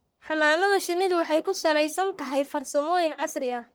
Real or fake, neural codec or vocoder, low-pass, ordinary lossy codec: fake; codec, 44.1 kHz, 1.7 kbps, Pupu-Codec; none; none